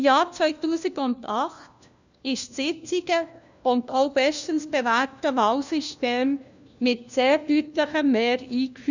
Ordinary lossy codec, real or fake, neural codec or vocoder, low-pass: none; fake; codec, 16 kHz, 1 kbps, FunCodec, trained on LibriTTS, 50 frames a second; 7.2 kHz